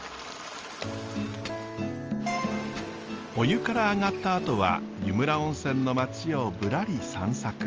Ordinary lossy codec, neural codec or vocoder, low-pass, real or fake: Opus, 24 kbps; none; 7.2 kHz; real